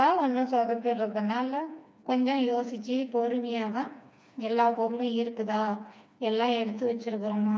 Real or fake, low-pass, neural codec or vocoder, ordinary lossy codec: fake; none; codec, 16 kHz, 2 kbps, FreqCodec, smaller model; none